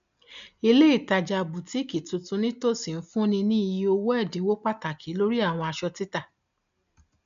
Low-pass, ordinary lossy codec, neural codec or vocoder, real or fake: 7.2 kHz; none; none; real